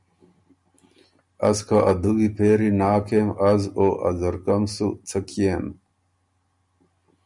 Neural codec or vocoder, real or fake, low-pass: none; real; 10.8 kHz